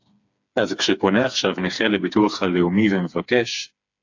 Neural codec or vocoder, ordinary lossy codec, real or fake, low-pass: codec, 16 kHz, 4 kbps, FreqCodec, smaller model; AAC, 48 kbps; fake; 7.2 kHz